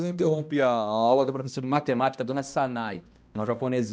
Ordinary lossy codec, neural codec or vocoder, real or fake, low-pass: none; codec, 16 kHz, 1 kbps, X-Codec, HuBERT features, trained on balanced general audio; fake; none